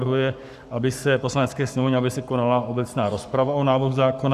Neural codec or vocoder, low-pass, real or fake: codec, 44.1 kHz, 7.8 kbps, Pupu-Codec; 14.4 kHz; fake